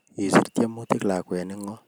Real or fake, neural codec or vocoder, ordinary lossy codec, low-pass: real; none; none; none